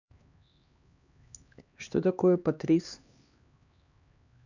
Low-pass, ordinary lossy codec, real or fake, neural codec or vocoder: 7.2 kHz; none; fake; codec, 16 kHz, 2 kbps, X-Codec, HuBERT features, trained on LibriSpeech